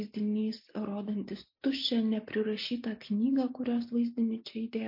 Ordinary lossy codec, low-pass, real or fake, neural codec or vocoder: MP3, 32 kbps; 5.4 kHz; real; none